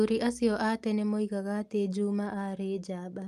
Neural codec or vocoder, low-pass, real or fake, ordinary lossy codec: none; 14.4 kHz; real; Opus, 32 kbps